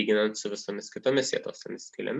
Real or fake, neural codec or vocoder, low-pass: real; none; 10.8 kHz